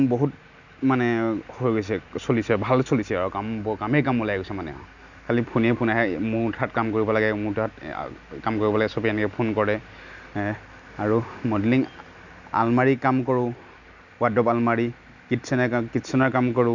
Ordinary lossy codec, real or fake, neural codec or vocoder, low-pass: none; real; none; 7.2 kHz